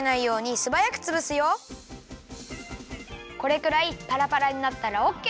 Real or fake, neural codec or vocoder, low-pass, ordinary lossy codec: real; none; none; none